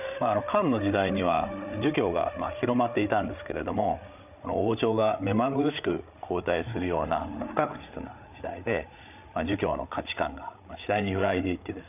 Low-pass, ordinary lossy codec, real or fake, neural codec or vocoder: 3.6 kHz; none; fake; codec, 16 kHz, 8 kbps, FreqCodec, larger model